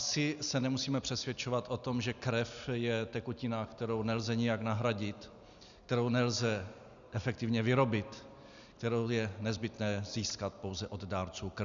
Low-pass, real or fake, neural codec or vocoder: 7.2 kHz; real; none